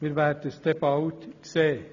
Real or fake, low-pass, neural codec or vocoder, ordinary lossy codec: real; 7.2 kHz; none; none